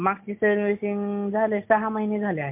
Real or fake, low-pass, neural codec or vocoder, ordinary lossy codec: real; 3.6 kHz; none; MP3, 32 kbps